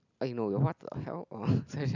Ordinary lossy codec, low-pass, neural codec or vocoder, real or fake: none; 7.2 kHz; none; real